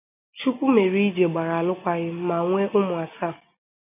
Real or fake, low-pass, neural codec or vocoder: real; 3.6 kHz; none